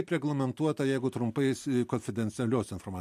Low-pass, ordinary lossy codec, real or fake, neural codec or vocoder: 14.4 kHz; MP3, 64 kbps; real; none